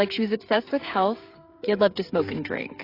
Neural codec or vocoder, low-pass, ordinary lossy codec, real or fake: codec, 16 kHz, 16 kbps, FreqCodec, smaller model; 5.4 kHz; AAC, 24 kbps; fake